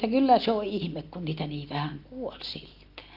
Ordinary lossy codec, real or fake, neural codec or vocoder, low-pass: Opus, 24 kbps; real; none; 5.4 kHz